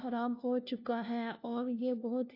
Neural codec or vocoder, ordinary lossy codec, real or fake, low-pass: codec, 16 kHz, 1 kbps, FunCodec, trained on LibriTTS, 50 frames a second; AAC, 32 kbps; fake; 5.4 kHz